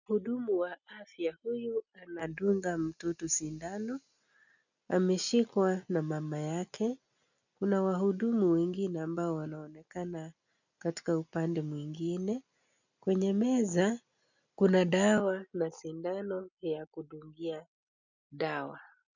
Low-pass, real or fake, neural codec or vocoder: 7.2 kHz; real; none